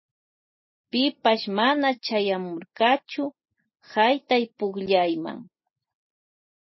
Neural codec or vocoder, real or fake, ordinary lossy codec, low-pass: none; real; MP3, 24 kbps; 7.2 kHz